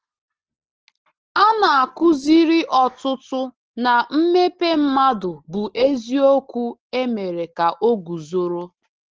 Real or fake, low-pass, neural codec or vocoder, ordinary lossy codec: real; 7.2 kHz; none; Opus, 16 kbps